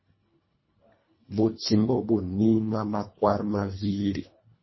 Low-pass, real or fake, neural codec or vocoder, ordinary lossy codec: 7.2 kHz; fake; codec, 24 kHz, 1.5 kbps, HILCodec; MP3, 24 kbps